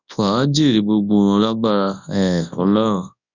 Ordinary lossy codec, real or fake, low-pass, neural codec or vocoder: none; fake; 7.2 kHz; codec, 24 kHz, 0.9 kbps, WavTokenizer, large speech release